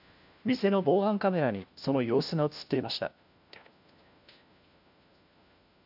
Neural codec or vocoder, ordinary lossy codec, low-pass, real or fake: codec, 16 kHz, 1 kbps, FunCodec, trained on LibriTTS, 50 frames a second; none; 5.4 kHz; fake